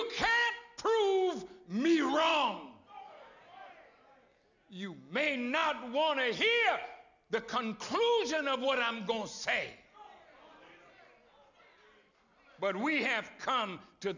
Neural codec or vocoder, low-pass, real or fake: none; 7.2 kHz; real